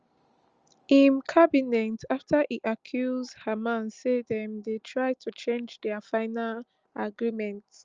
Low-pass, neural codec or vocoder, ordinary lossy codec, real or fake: 7.2 kHz; none; Opus, 24 kbps; real